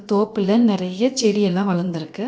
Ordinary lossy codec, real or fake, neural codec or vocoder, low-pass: none; fake; codec, 16 kHz, about 1 kbps, DyCAST, with the encoder's durations; none